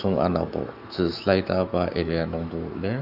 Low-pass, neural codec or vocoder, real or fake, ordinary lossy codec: 5.4 kHz; autoencoder, 48 kHz, 128 numbers a frame, DAC-VAE, trained on Japanese speech; fake; none